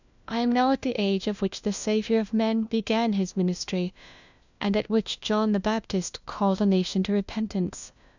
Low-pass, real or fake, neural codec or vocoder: 7.2 kHz; fake; codec, 16 kHz, 1 kbps, FunCodec, trained on LibriTTS, 50 frames a second